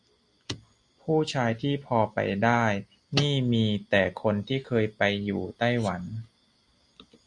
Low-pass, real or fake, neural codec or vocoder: 10.8 kHz; real; none